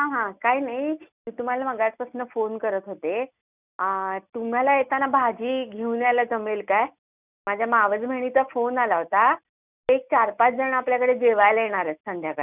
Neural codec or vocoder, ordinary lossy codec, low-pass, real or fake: none; none; 3.6 kHz; real